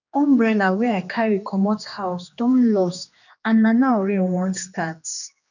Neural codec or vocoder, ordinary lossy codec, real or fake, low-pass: codec, 16 kHz, 2 kbps, X-Codec, HuBERT features, trained on general audio; none; fake; 7.2 kHz